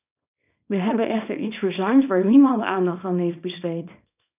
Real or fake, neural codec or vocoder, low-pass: fake; codec, 24 kHz, 0.9 kbps, WavTokenizer, small release; 3.6 kHz